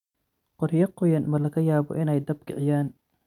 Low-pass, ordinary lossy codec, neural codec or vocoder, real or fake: 19.8 kHz; none; none; real